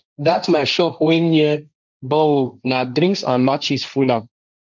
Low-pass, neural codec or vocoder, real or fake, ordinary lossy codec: 7.2 kHz; codec, 16 kHz, 1.1 kbps, Voila-Tokenizer; fake; none